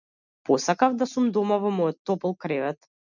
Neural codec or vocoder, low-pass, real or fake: none; 7.2 kHz; real